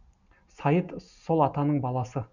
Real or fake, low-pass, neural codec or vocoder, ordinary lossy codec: real; 7.2 kHz; none; none